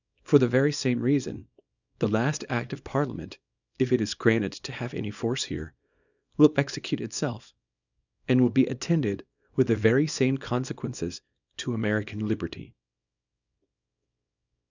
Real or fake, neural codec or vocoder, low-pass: fake; codec, 24 kHz, 0.9 kbps, WavTokenizer, small release; 7.2 kHz